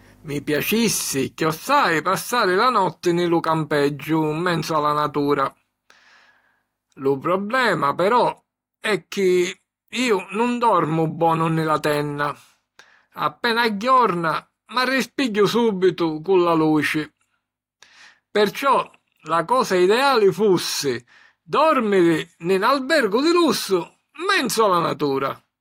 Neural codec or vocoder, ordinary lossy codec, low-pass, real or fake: none; AAC, 48 kbps; 19.8 kHz; real